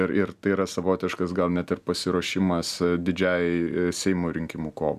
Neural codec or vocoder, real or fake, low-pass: none; real; 14.4 kHz